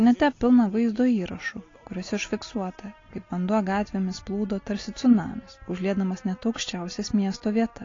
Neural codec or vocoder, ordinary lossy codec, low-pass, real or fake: none; AAC, 32 kbps; 7.2 kHz; real